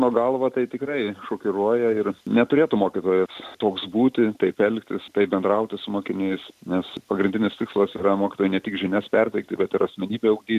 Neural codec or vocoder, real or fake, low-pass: none; real; 14.4 kHz